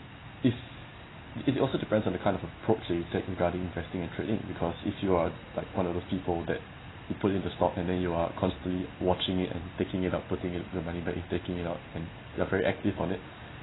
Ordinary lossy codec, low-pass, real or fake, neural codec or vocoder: AAC, 16 kbps; 7.2 kHz; real; none